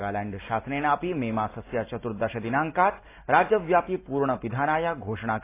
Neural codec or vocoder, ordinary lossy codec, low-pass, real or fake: none; AAC, 24 kbps; 3.6 kHz; real